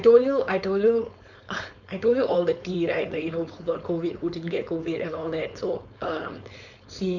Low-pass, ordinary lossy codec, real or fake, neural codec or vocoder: 7.2 kHz; none; fake; codec, 16 kHz, 4.8 kbps, FACodec